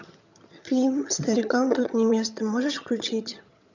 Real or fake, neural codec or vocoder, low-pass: fake; vocoder, 22.05 kHz, 80 mel bands, HiFi-GAN; 7.2 kHz